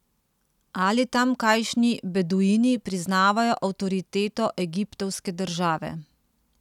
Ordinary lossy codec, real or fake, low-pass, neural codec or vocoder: none; real; 19.8 kHz; none